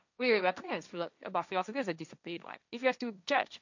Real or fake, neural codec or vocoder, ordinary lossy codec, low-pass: fake; codec, 16 kHz, 1.1 kbps, Voila-Tokenizer; none; 7.2 kHz